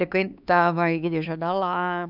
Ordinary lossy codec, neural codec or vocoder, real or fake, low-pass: none; codec, 16 kHz, 4 kbps, X-Codec, HuBERT features, trained on LibriSpeech; fake; 5.4 kHz